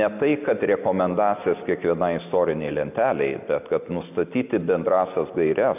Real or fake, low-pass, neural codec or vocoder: real; 3.6 kHz; none